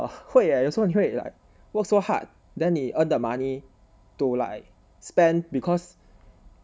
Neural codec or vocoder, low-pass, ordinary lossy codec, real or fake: none; none; none; real